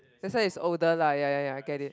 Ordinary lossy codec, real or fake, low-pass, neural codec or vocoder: none; real; none; none